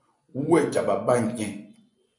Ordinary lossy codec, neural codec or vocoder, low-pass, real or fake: MP3, 96 kbps; vocoder, 24 kHz, 100 mel bands, Vocos; 10.8 kHz; fake